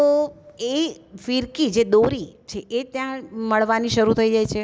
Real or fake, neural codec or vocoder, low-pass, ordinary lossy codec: real; none; none; none